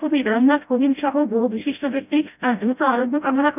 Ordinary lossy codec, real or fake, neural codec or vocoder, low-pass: none; fake; codec, 16 kHz, 0.5 kbps, FreqCodec, smaller model; 3.6 kHz